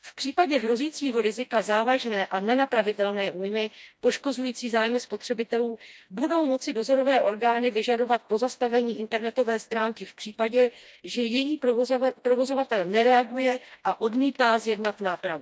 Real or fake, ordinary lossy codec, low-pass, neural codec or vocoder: fake; none; none; codec, 16 kHz, 1 kbps, FreqCodec, smaller model